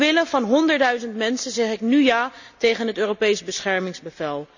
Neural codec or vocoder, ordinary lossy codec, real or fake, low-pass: none; none; real; 7.2 kHz